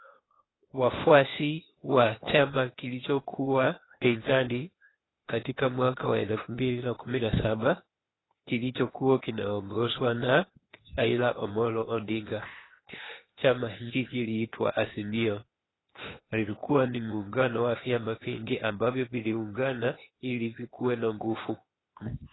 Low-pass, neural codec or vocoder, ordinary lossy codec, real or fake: 7.2 kHz; codec, 16 kHz, 0.8 kbps, ZipCodec; AAC, 16 kbps; fake